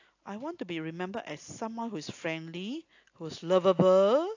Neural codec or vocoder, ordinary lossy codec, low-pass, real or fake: none; AAC, 48 kbps; 7.2 kHz; real